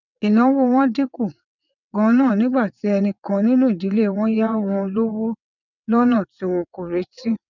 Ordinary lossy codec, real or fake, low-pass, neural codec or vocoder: none; fake; 7.2 kHz; vocoder, 22.05 kHz, 80 mel bands, WaveNeXt